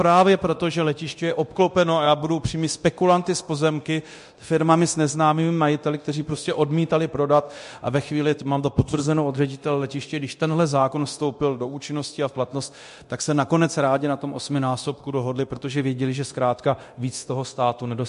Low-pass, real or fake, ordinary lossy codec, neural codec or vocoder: 10.8 kHz; fake; MP3, 48 kbps; codec, 24 kHz, 0.9 kbps, DualCodec